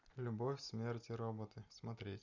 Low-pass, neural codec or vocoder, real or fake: 7.2 kHz; none; real